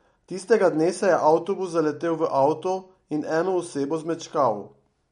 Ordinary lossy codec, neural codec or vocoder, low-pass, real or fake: MP3, 48 kbps; none; 10.8 kHz; real